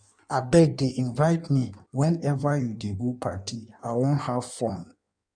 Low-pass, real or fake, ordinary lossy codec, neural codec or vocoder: 9.9 kHz; fake; none; codec, 16 kHz in and 24 kHz out, 1.1 kbps, FireRedTTS-2 codec